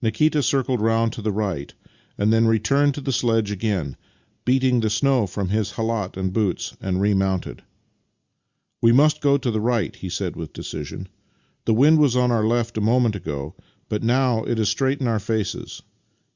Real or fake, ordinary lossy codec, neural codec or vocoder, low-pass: real; Opus, 64 kbps; none; 7.2 kHz